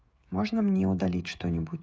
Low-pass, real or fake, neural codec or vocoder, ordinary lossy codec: none; fake; codec, 16 kHz, 16 kbps, FreqCodec, smaller model; none